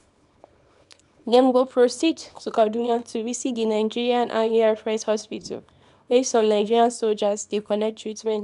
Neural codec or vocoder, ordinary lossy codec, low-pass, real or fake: codec, 24 kHz, 0.9 kbps, WavTokenizer, small release; none; 10.8 kHz; fake